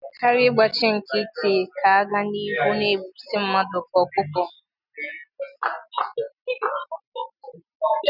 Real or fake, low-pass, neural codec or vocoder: real; 5.4 kHz; none